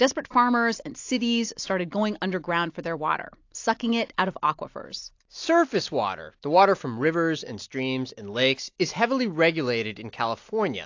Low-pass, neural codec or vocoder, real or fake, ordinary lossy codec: 7.2 kHz; none; real; AAC, 48 kbps